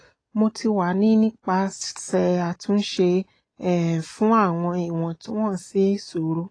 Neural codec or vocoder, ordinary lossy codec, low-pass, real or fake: none; AAC, 32 kbps; 9.9 kHz; real